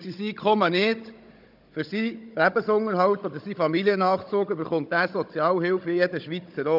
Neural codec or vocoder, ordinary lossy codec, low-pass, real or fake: codec, 16 kHz, 16 kbps, FunCodec, trained on Chinese and English, 50 frames a second; none; 5.4 kHz; fake